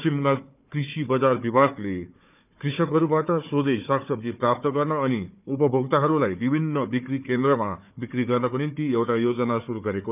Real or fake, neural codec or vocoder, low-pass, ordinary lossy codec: fake; codec, 16 kHz, 4 kbps, FunCodec, trained on Chinese and English, 50 frames a second; 3.6 kHz; none